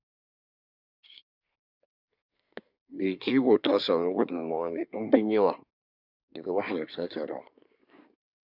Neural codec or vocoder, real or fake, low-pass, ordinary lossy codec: codec, 24 kHz, 1 kbps, SNAC; fake; 5.4 kHz; none